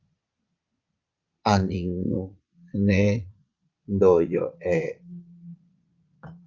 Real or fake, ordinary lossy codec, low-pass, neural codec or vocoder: fake; Opus, 32 kbps; 7.2 kHz; vocoder, 22.05 kHz, 80 mel bands, Vocos